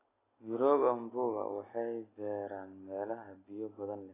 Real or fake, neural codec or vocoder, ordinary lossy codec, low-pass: real; none; AAC, 16 kbps; 3.6 kHz